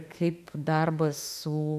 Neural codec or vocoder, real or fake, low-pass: autoencoder, 48 kHz, 32 numbers a frame, DAC-VAE, trained on Japanese speech; fake; 14.4 kHz